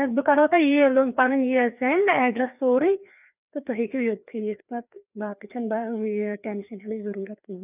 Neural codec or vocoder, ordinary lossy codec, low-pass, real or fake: codec, 16 kHz, 2 kbps, FreqCodec, larger model; none; 3.6 kHz; fake